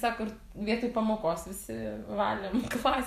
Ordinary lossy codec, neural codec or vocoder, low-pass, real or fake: MP3, 96 kbps; vocoder, 44.1 kHz, 128 mel bands every 512 samples, BigVGAN v2; 14.4 kHz; fake